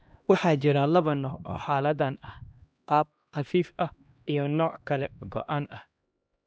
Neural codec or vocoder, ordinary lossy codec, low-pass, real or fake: codec, 16 kHz, 1 kbps, X-Codec, HuBERT features, trained on LibriSpeech; none; none; fake